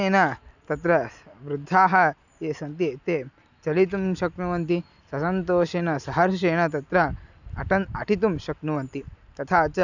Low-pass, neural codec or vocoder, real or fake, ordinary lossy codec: 7.2 kHz; none; real; none